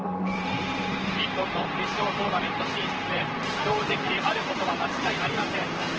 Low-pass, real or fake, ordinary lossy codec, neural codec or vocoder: 7.2 kHz; fake; Opus, 16 kbps; vocoder, 44.1 kHz, 128 mel bands, Pupu-Vocoder